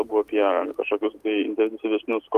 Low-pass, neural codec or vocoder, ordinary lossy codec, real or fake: 14.4 kHz; none; Opus, 32 kbps; real